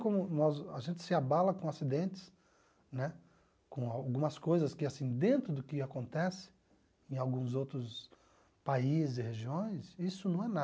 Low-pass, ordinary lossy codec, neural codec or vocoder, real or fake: none; none; none; real